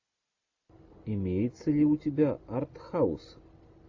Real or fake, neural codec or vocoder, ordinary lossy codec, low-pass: real; none; AAC, 48 kbps; 7.2 kHz